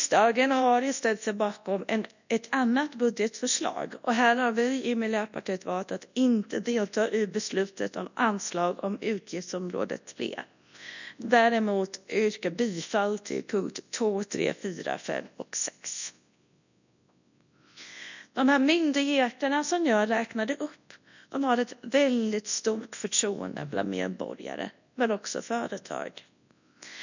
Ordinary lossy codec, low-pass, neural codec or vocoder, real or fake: none; 7.2 kHz; codec, 24 kHz, 0.9 kbps, WavTokenizer, large speech release; fake